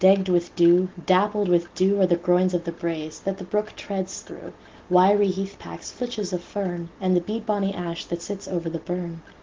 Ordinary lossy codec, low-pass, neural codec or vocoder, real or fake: Opus, 16 kbps; 7.2 kHz; none; real